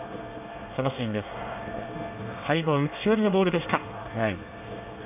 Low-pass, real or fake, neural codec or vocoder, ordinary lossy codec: 3.6 kHz; fake; codec, 24 kHz, 1 kbps, SNAC; none